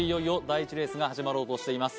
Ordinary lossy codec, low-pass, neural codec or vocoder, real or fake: none; none; none; real